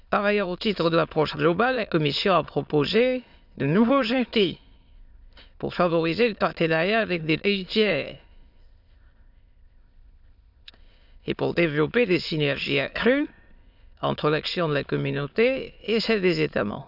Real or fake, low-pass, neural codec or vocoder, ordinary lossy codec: fake; 5.4 kHz; autoencoder, 22.05 kHz, a latent of 192 numbers a frame, VITS, trained on many speakers; none